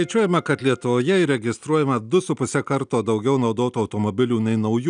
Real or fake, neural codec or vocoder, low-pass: real; none; 9.9 kHz